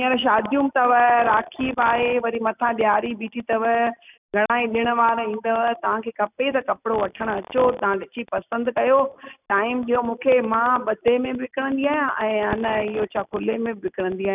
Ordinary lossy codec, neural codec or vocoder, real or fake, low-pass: none; none; real; 3.6 kHz